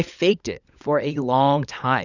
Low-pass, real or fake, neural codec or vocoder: 7.2 kHz; fake; codec, 16 kHz, 16 kbps, FunCodec, trained on LibriTTS, 50 frames a second